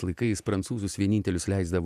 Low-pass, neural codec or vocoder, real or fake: 14.4 kHz; vocoder, 48 kHz, 128 mel bands, Vocos; fake